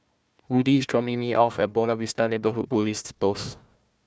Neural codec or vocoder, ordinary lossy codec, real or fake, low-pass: codec, 16 kHz, 1 kbps, FunCodec, trained on Chinese and English, 50 frames a second; none; fake; none